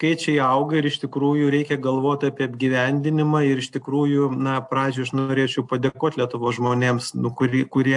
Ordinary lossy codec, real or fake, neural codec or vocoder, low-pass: AAC, 64 kbps; real; none; 10.8 kHz